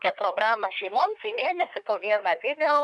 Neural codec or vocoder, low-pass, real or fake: codec, 24 kHz, 1 kbps, SNAC; 10.8 kHz; fake